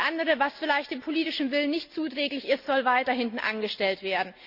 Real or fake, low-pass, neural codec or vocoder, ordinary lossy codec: real; 5.4 kHz; none; Opus, 64 kbps